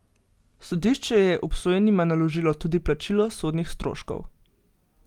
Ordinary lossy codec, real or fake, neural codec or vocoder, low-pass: Opus, 32 kbps; real; none; 19.8 kHz